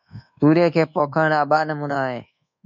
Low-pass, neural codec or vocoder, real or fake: 7.2 kHz; codec, 24 kHz, 1.2 kbps, DualCodec; fake